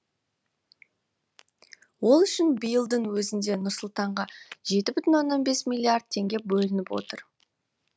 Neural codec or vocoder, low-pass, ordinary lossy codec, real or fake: none; none; none; real